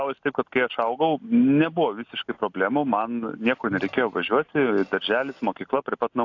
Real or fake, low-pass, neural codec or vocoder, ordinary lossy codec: real; 7.2 kHz; none; AAC, 48 kbps